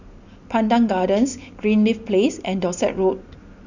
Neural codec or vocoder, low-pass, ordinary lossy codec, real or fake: none; 7.2 kHz; none; real